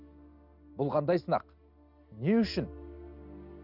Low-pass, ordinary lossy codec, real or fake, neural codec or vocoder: 5.4 kHz; none; real; none